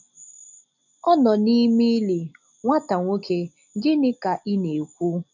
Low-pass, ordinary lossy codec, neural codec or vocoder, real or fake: 7.2 kHz; none; none; real